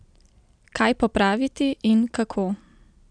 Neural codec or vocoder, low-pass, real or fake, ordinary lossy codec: none; 9.9 kHz; real; none